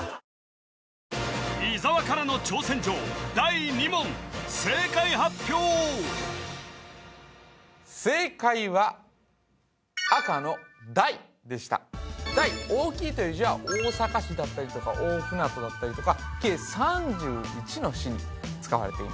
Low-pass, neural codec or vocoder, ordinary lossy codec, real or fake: none; none; none; real